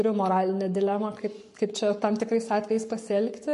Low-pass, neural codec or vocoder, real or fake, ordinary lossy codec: 14.4 kHz; codec, 44.1 kHz, 7.8 kbps, Pupu-Codec; fake; MP3, 48 kbps